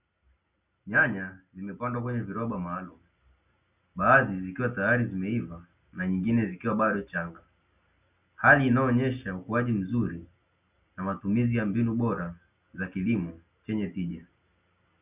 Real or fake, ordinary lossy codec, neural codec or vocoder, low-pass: real; Opus, 64 kbps; none; 3.6 kHz